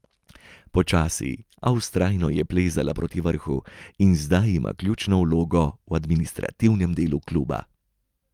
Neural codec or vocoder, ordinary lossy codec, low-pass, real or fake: none; Opus, 32 kbps; 19.8 kHz; real